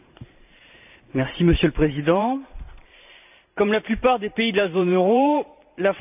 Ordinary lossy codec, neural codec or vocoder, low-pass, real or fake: none; vocoder, 44.1 kHz, 128 mel bands every 512 samples, BigVGAN v2; 3.6 kHz; fake